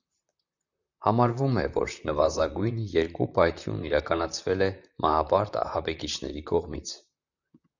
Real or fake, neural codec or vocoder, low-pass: fake; vocoder, 44.1 kHz, 128 mel bands, Pupu-Vocoder; 7.2 kHz